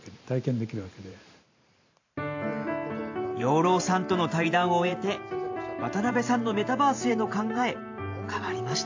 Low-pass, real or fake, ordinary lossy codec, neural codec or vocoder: 7.2 kHz; fake; AAC, 48 kbps; vocoder, 44.1 kHz, 128 mel bands every 512 samples, BigVGAN v2